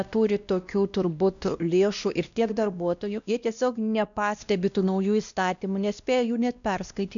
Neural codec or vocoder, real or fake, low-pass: codec, 16 kHz, 1 kbps, X-Codec, WavLM features, trained on Multilingual LibriSpeech; fake; 7.2 kHz